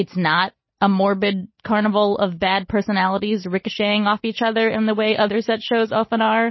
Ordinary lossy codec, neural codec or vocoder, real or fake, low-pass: MP3, 24 kbps; none; real; 7.2 kHz